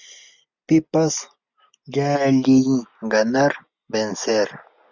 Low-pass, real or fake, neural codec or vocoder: 7.2 kHz; real; none